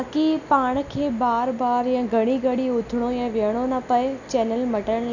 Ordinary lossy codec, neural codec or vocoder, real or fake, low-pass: none; none; real; 7.2 kHz